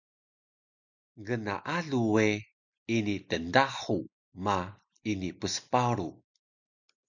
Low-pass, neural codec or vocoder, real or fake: 7.2 kHz; none; real